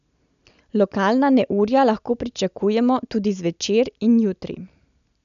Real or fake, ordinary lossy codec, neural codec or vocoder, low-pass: real; none; none; 7.2 kHz